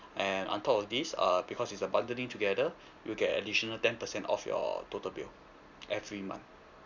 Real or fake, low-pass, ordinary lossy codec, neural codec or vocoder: real; 7.2 kHz; none; none